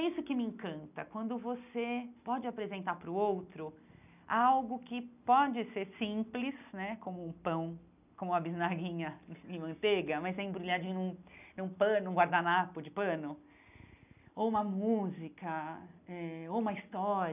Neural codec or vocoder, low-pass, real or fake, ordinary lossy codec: none; 3.6 kHz; real; none